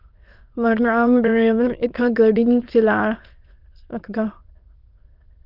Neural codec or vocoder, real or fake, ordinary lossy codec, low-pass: autoencoder, 22.05 kHz, a latent of 192 numbers a frame, VITS, trained on many speakers; fake; Opus, 24 kbps; 5.4 kHz